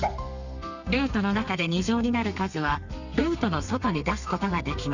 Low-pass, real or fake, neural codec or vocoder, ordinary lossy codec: 7.2 kHz; fake; codec, 32 kHz, 1.9 kbps, SNAC; none